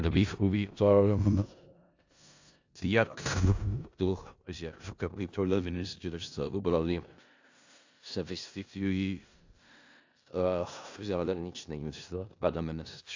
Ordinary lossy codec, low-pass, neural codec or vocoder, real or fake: AAC, 48 kbps; 7.2 kHz; codec, 16 kHz in and 24 kHz out, 0.4 kbps, LongCat-Audio-Codec, four codebook decoder; fake